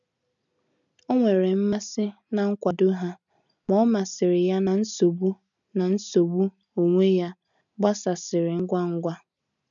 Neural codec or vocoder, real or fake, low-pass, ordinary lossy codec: none; real; 7.2 kHz; none